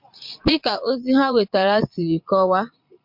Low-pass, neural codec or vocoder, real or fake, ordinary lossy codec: 5.4 kHz; vocoder, 22.05 kHz, 80 mel bands, Vocos; fake; MP3, 48 kbps